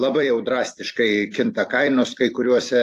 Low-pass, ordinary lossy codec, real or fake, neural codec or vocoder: 14.4 kHz; AAC, 64 kbps; fake; vocoder, 44.1 kHz, 128 mel bands every 256 samples, BigVGAN v2